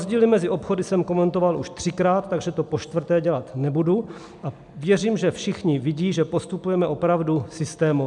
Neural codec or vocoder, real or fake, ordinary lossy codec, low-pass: none; real; MP3, 96 kbps; 10.8 kHz